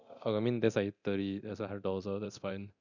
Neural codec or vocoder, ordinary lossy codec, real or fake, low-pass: codec, 24 kHz, 0.9 kbps, DualCodec; none; fake; 7.2 kHz